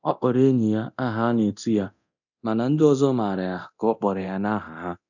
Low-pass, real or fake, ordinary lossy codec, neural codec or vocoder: 7.2 kHz; fake; none; codec, 24 kHz, 0.9 kbps, DualCodec